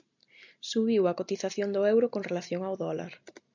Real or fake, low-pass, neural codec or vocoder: real; 7.2 kHz; none